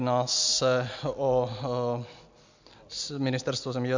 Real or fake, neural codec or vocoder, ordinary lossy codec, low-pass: real; none; MP3, 64 kbps; 7.2 kHz